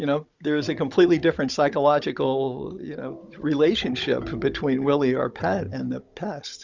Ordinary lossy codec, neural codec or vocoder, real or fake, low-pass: Opus, 64 kbps; codec, 16 kHz, 16 kbps, FunCodec, trained on Chinese and English, 50 frames a second; fake; 7.2 kHz